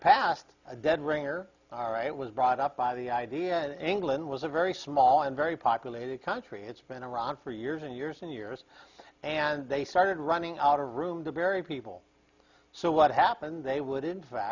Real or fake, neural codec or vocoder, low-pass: real; none; 7.2 kHz